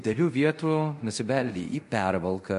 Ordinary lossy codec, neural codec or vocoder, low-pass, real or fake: MP3, 48 kbps; codec, 24 kHz, 0.5 kbps, DualCodec; 10.8 kHz; fake